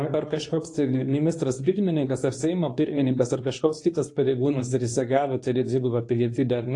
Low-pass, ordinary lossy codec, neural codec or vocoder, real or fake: 10.8 kHz; AAC, 48 kbps; codec, 24 kHz, 0.9 kbps, WavTokenizer, medium speech release version 2; fake